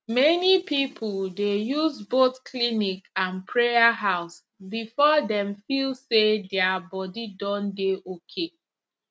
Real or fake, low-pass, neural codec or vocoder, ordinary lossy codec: real; none; none; none